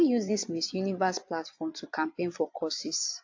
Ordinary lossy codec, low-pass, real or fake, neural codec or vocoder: AAC, 48 kbps; 7.2 kHz; fake; vocoder, 22.05 kHz, 80 mel bands, Vocos